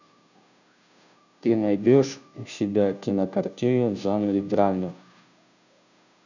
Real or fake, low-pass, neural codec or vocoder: fake; 7.2 kHz; codec, 16 kHz, 0.5 kbps, FunCodec, trained on Chinese and English, 25 frames a second